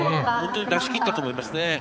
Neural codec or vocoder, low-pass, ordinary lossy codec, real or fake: codec, 16 kHz, 4 kbps, X-Codec, HuBERT features, trained on balanced general audio; none; none; fake